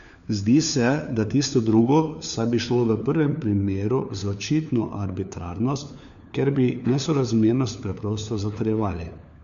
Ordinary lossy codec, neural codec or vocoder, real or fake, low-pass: AAC, 96 kbps; codec, 16 kHz, 4 kbps, FunCodec, trained on LibriTTS, 50 frames a second; fake; 7.2 kHz